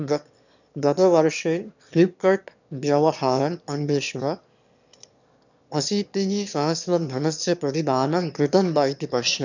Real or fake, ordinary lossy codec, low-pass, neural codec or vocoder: fake; none; 7.2 kHz; autoencoder, 22.05 kHz, a latent of 192 numbers a frame, VITS, trained on one speaker